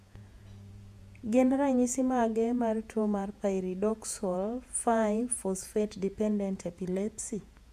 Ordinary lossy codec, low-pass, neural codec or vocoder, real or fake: AAC, 96 kbps; 14.4 kHz; vocoder, 48 kHz, 128 mel bands, Vocos; fake